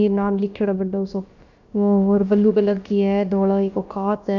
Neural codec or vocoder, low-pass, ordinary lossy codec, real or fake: codec, 16 kHz, about 1 kbps, DyCAST, with the encoder's durations; 7.2 kHz; none; fake